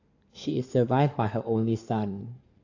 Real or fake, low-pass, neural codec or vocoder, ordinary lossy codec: fake; 7.2 kHz; codec, 16 kHz in and 24 kHz out, 2.2 kbps, FireRedTTS-2 codec; AAC, 48 kbps